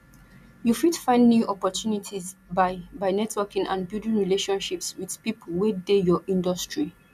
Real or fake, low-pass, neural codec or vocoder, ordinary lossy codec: real; 14.4 kHz; none; none